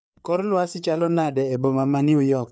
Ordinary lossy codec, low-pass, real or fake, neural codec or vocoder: none; none; fake; codec, 16 kHz, 4 kbps, FreqCodec, larger model